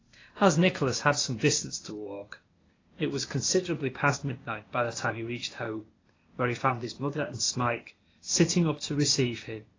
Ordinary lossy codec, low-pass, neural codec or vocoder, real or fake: AAC, 32 kbps; 7.2 kHz; codec, 16 kHz, 0.8 kbps, ZipCodec; fake